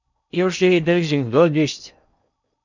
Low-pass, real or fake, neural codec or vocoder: 7.2 kHz; fake; codec, 16 kHz in and 24 kHz out, 0.6 kbps, FocalCodec, streaming, 4096 codes